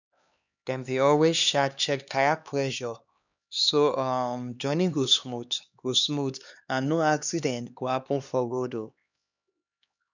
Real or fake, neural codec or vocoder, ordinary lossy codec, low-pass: fake; codec, 16 kHz, 2 kbps, X-Codec, HuBERT features, trained on LibriSpeech; none; 7.2 kHz